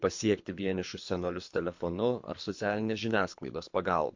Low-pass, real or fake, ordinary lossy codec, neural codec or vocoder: 7.2 kHz; fake; MP3, 48 kbps; codec, 24 kHz, 3 kbps, HILCodec